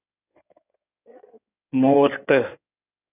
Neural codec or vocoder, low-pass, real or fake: codec, 16 kHz in and 24 kHz out, 2.2 kbps, FireRedTTS-2 codec; 3.6 kHz; fake